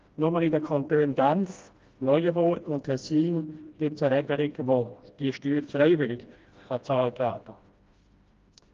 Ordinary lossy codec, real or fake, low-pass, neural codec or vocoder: Opus, 32 kbps; fake; 7.2 kHz; codec, 16 kHz, 1 kbps, FreqCodec, smaller model